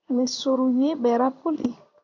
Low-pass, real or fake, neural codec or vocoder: 7.2 kHz; fake; codec, 16 kHz in and 24 kHz out, 1 kbps, XY-Tokenizer